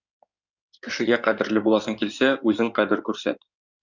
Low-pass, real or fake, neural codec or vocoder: 7.2 kHz; fake; codec, 16 kHz in and 24 kHz out, 2.2 kbps, FireRedTTS-2 codec